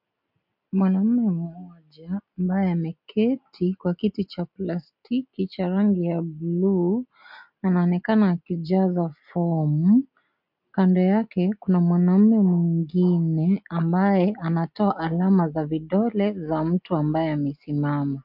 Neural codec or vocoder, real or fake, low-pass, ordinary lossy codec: none; real; 5.4 kHz; MP3, 48 kbps